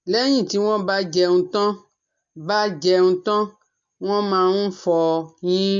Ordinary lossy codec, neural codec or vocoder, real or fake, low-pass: MP3, 48 kbps; none; real; 7.2 kHz